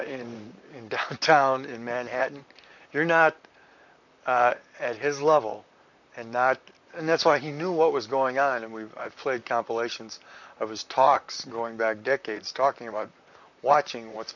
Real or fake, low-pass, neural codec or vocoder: fake; 7.2 kHz; vocoder, 44.1 kHz, 128 mel bands, Pupu-Vocoder